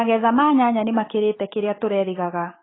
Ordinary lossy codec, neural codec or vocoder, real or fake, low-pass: AAC, 16 kbps; autoencoder, 48 kHz, 128 numbers a frame, DAC-VAE, trained on Japanese speech; fake; 7.2 kHz